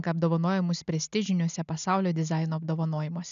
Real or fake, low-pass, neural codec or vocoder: real; 7.2 kHz; none